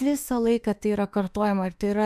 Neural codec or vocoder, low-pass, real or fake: autoencoder, 48 kHz, 32 numbers a frame, DAC-VAE, trained on Japanese speech; 14.4 kHz; fake